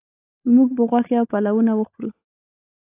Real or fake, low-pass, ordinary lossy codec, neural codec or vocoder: fake; 3.6 kHz; AAC, 24 kbps; codec, 16 kHz, 16 kbps, FunCodec, trained on LibriTTS, 50 frames a second